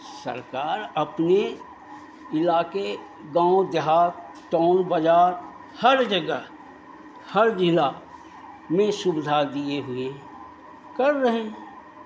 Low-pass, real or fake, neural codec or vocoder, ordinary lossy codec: none; real; none; none